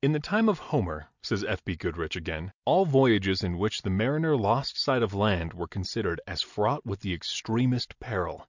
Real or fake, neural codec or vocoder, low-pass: real; none; 7.2 kHz